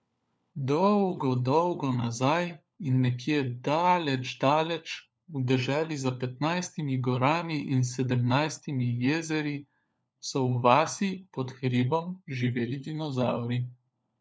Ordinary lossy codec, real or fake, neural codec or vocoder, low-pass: none; fake; codec, 16 kHz, 4 kbps, FunCodec, trained on LibriTTS, 50 frames a second; none